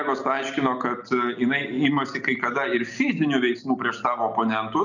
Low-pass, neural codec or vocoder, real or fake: 7.2 kHz; none; real